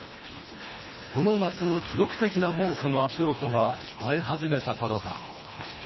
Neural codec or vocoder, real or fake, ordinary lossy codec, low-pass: codec, 24 kHz, 1.5 kbps, HILCodec; fake; MP3, 24 kbps; 7.2 kHz